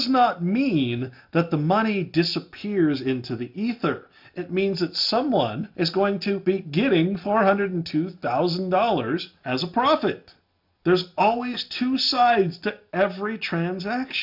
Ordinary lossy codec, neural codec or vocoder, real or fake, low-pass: MP3, 48 kbps; none; real; 5.4 kHz